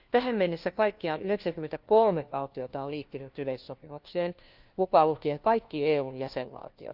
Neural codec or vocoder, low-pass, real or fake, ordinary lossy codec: codec, 16 kHz, 1 kbps, FunCodec, trained on LibriTTS, 50 frames a second; 5.4 kHz; fake; Opus, 24 kbps